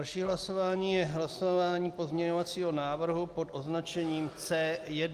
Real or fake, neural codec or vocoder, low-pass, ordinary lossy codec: real; none; 14.4 kHz; Opus, 32 kbps